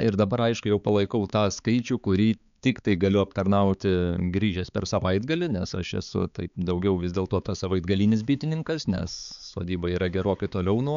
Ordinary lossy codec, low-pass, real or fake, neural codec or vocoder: MP3, 96 kbps; 7.2 kHz; fake; codec, 16 kHz, 4 kbps, X-Codec, HuBERT features, trained on balanced general audio